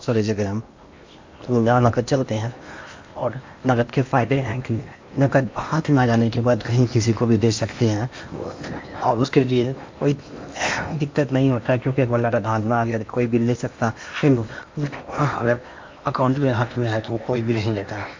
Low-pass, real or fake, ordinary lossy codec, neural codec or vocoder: 7.2 kHz; fake; MP3, 48 kbps; codec, 16 kHz in and 24 kHz out, 0.8 kbps, FocalCodec, streaming, 65536 codes